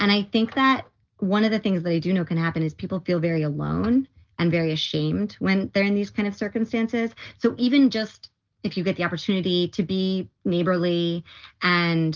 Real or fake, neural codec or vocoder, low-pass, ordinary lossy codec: real; none; 7.2 kHz; Opus, 24 kbps